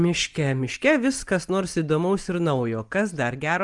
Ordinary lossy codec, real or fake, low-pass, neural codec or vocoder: Opus, 32 kbps; real; 10.8 kHz; none